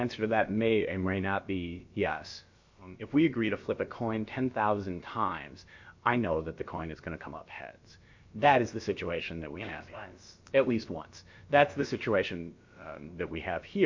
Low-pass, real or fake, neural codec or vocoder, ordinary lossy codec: 7.2 kHz; fake; codec, 16 kHz, about 1 kbps, DyCAST, with the encoder's durations; MP3, 48 kbps